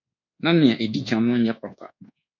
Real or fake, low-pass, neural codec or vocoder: fake; 7.2 kHz; codec, 24 kHz, 1.2 kbps, DualCodec